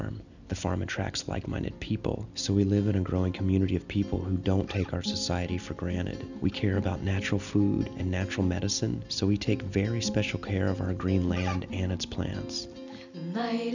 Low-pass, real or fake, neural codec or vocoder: 7.2 kHz; real; none